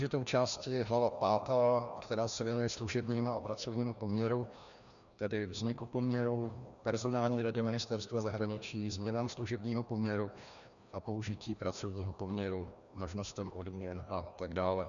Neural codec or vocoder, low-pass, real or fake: codec, 16 kHz, 1 kbps, FreqCodec, larger model; 7.2 kHz; fake